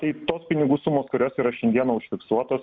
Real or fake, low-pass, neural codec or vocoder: real; 7.2 kHz; none